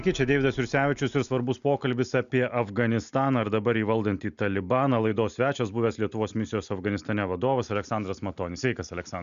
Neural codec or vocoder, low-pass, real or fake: none; 7.2 kHz; real